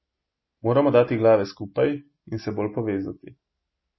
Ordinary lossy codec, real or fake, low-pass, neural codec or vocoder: MP3, 24 kbps; real; 7.2 kHz; none